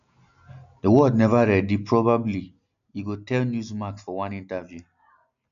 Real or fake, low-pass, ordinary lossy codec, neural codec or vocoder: real; 7.2 kHz; none; none